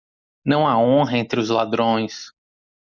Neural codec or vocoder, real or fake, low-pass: none; real; 7.2 kHz